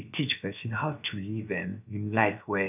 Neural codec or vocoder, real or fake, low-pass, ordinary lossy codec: codec, 16 kHz, 0.7 kbps, FocalCodec; fake; 3.6 kHz; none